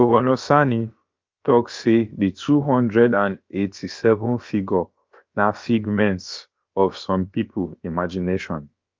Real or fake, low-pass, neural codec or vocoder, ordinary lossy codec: fake; 7.2 kHz; codec, 16 kHz, about 1 kbps, DyCAST, with the encoder's durations; Opus, 32 kbps